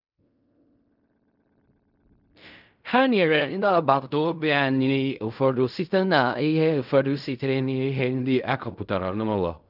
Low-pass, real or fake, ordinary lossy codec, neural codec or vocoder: 5.4 kHz; fake; none; codec, 16 kHz in and 24 kHz out, 0.4 kbps, LongCat-Audio-Codec, fine tuned four codebook decoder